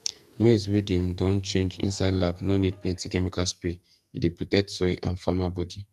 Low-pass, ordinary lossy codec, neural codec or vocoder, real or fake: 14.4 kHz; none; codec, 44.1 kHz, 2.6 kbps, SNAC; fake